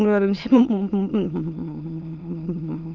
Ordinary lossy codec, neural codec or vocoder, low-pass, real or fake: Opus, 24 kbps; autoencoder, 22.05 kHz, a latent of 192 numbers a frame, VITS, trained on many speakers; 7.2 kHz; fake